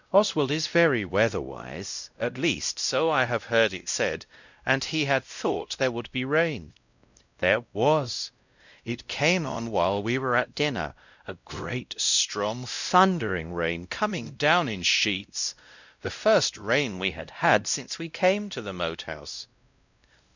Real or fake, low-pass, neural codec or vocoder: fake; 7.2 kHz; codec, 16 kHz, 0.5 kbps, X-Codec, WavLM features, trained on Multilingual LibriSpeech